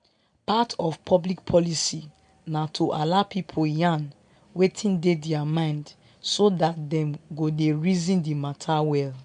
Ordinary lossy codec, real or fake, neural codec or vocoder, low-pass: MP3, 64 kbps; real; none; 9.9 kHz